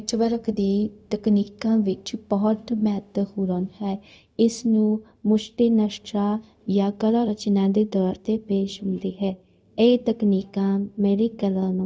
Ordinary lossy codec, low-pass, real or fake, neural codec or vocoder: none; none; fake; codec, 16 kHz, 0.4 kbps, LongCat-Audio-Codec